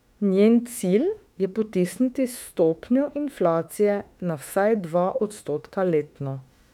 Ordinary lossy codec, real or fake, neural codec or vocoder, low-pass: none; fake; autoencoder, 48 kHz, 32 numbers a frame, DAC-VAE, trained on Japanese speech; 19.8 kHz